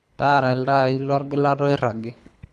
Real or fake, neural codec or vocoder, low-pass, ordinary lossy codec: fake; codec, 24 kHz, 3 kbps, HILCodec; none; none